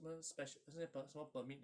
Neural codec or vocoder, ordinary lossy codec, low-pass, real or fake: none; none; 9.9 kHz; real